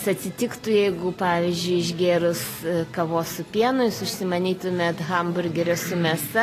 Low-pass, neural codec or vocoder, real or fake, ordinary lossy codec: 14.4 kHz; none; real; AAC, 48 kbps